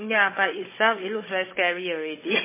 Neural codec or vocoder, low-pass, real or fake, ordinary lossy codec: codec, 16 kHz, 16 kbps, FunCodec, trained on Chinese and English, 50 frames a second; 3.6 kHz; fake; MP3, 16 kbps